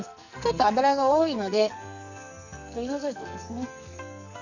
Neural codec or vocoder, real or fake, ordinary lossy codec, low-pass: codec, 44.1 kHz, 2.6 kbps, SNAC; fake; none; 7.2 kHz